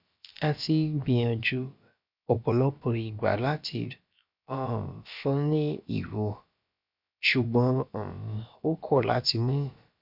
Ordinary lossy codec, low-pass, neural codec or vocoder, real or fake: none; 5.4 kHz; codec, 16 kHz, about 1 kbps, DyCAST, with the encoder's durations; fake